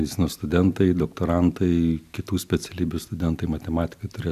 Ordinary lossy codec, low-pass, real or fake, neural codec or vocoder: AAC, 96 kbps; 14.4 kHz; real; none